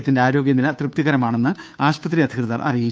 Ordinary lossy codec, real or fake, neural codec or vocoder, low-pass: none; fake; codec, 16 kHz, 2 kbps, FunCodec, trained on Chinese and English, 25 frames a second; none